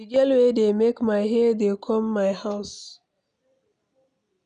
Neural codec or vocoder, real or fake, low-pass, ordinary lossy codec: none; real; 9.9 kHz; none